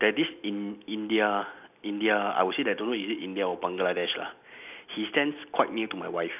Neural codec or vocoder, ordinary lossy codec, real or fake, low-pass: none; none; real; 3.6 kHz